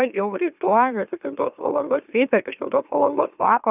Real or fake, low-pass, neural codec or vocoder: fake; 3.6 kHz; autoencoder, 44.1 kHz, a latent of 192 numbers a frame, MeloTTS